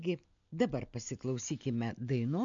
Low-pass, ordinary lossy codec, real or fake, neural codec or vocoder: 7.2 kHz; MP3, 64 kbps; real; none